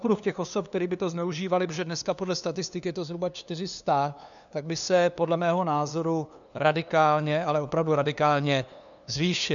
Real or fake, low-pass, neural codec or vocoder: fake; 7.2 kHz; codec, 16 kHz, 2 kbps, FunCodec, trained on LibriTTS, 25 frames a second